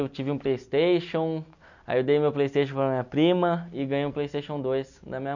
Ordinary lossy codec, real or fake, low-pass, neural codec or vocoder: none; real; 7.2 kHz; none